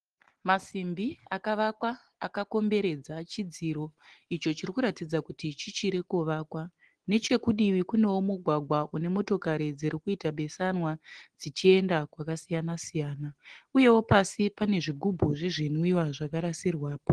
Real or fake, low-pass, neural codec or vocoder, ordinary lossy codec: real; 9.9 kHz; none; Opus, 16 kbps